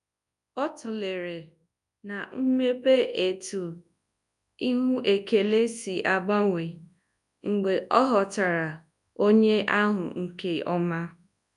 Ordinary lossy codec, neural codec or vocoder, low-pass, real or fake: none; codec, 24 kHz, 0.9 kbps, WavTokenizer, large speech release; 10.8 kHz; fake